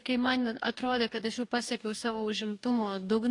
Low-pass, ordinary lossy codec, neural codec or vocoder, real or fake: 10.8 kHz; AAC, 48 kbps; codec, 44.1 kHz, 2.6 kbps, DAC; fake